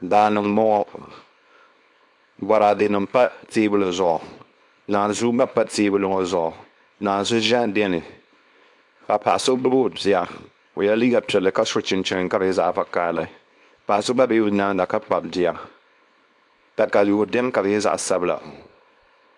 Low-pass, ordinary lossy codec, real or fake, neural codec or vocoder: 10.8 kHz; AAC, 64 kbps; fake; codec, 24 kHz, 0.9 kbps, WavTokenizer, small release